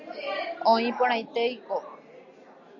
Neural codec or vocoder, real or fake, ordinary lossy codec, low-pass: none; real; Opus, 64 kbps; 7.2 kHz